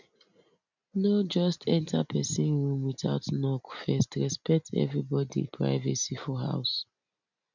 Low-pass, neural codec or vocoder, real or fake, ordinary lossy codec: 7.2 kHz; none; real; none